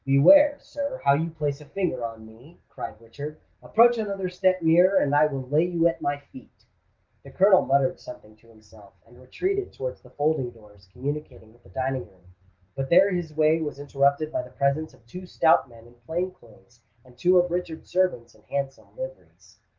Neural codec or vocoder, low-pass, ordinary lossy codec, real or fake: none; 7.2 kHz; Opus, 32 kbps; real